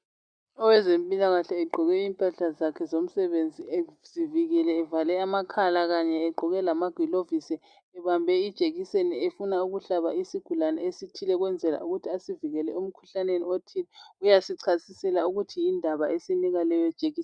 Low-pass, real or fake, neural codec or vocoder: 9.9 kHz; real; none